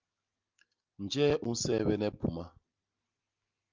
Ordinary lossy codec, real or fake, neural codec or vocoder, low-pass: Opus, 24 kbps; real; none; 7.2 kHz